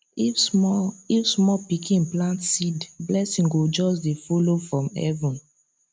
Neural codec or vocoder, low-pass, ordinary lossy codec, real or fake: none; none; none; real